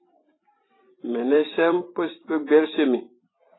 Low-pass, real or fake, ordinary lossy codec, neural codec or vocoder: 7.2 kHz; fake; AAC, 16 kbps; vocoder, 44.1 kHz, 128 mel bands every 512 samples, BigVGAN v2